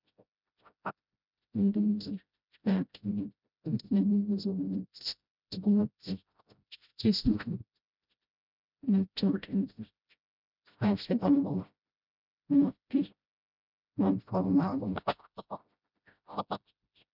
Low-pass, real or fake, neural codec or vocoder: 5.4 kHz; fake; codec, 16 kHz, 0.5 kbps, FreqCodec, smaller model